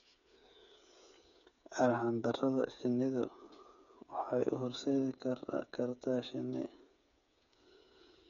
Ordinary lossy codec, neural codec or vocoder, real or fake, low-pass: none; codec, 16 kHz, 8 kbps, FreqCodec, smaller model; fake; 7.2 kHz